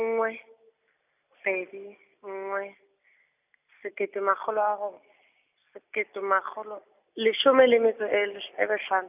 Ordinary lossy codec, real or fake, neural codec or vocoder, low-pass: none; real; none; 3.6 kHz